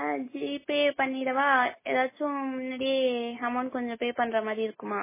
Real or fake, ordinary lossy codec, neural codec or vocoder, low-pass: real; MP3, 16 kbps; none; 3.6 kHz